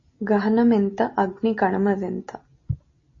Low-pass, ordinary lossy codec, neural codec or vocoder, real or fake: 7.2 kHz; MP3, 32 kbps; none; real